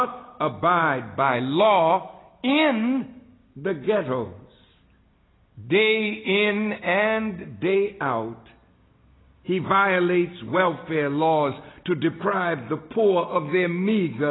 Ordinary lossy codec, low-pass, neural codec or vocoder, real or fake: AAC, 16 kbps; 7.2 kHz; none; real